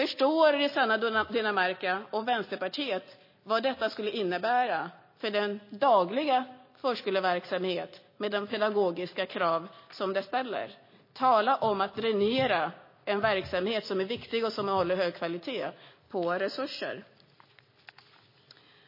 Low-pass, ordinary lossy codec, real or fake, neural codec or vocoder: 5.4 kHz; MP3, 24 kbps; real; none